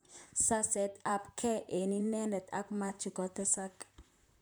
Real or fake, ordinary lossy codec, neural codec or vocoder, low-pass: fake; none; vocoder, 44.1 kHz, 128 mel bands, Pupu-Vocoder; none